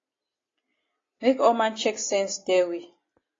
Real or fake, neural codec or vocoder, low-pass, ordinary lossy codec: real; none; 7.2 kHz; AAC, 32 kbps